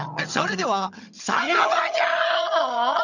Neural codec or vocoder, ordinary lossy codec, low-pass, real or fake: vocoder, 22.05 kHz, 80 mel bands, HiFi-GAN; none; 7.2 kHz; fake